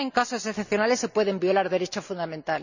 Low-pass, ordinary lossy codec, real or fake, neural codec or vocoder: 7.2 kHz; none; real; none